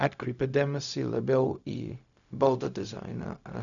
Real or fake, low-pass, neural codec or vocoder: fake; 7.2 kHz; codec, 16 kHz, 0.4 kbps, LongCat-Audio-Codec